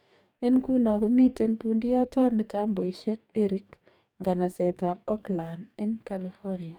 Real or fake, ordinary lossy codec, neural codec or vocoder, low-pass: fake; none; codec, 44.1 kHz, 2.6 kbps, DAC; 19.8 kHz